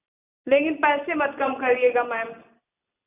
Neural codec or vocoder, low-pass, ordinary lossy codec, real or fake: none; 3.6 kHz; none; real